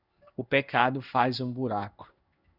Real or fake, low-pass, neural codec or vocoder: fake; 5.4 kHz; codec, 16 kHz in and 24 kHz out, 1 kbps, XY-Tokenizer